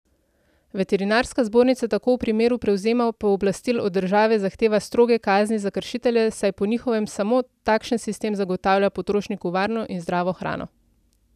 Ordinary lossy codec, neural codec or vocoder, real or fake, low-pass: none; none; real; 14.4 kHz